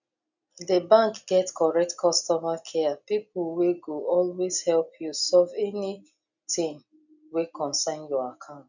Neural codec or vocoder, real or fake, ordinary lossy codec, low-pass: none; real; none; 7.2 kHz